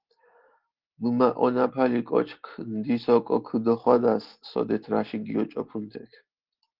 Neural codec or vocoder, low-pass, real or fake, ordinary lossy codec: none; 5.4 kHz; real; Opus, 16 kbps